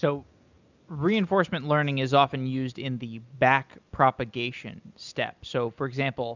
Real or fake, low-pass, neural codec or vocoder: real; 7.2 kHz; none